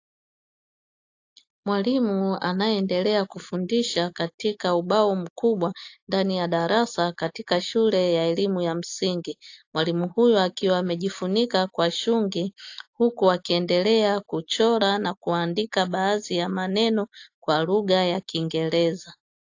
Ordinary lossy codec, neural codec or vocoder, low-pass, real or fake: AAC, 48 kbps; none; 7.2 kHz; real